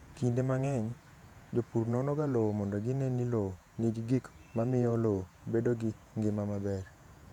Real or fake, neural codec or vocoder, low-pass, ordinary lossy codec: fake; vocoder, 48 kHz, 128 mel bands, Vocos; 19.8 kHz; none